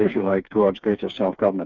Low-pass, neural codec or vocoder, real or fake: 7.2 kHz; codec, 16 kHz, 4 kbps, FreqCodec, smaller model; fake